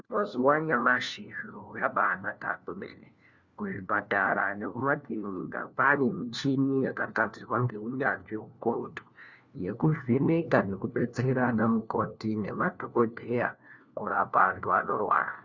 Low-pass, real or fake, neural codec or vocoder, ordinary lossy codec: 7.2 kHz; fake; codec, 16 kHz, 1 kbps, FunCodec, trained on LibriTTS, 50 frames a second; Opus, 64 kbps